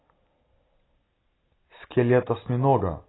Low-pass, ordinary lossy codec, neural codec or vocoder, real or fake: 7.2 kHz; AAC, 16 kbps; none; real